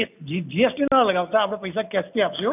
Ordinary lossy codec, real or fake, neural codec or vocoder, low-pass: none; real; none; 3.6 kHz